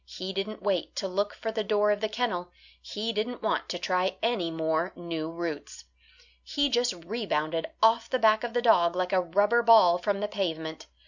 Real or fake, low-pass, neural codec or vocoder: real; 7.2 kHz; none